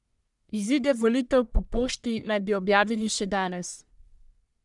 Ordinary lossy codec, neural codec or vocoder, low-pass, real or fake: none; codec, 44.1 kHz, 1.7 kbps, Pupu-Codec; 10.8 kHz; fake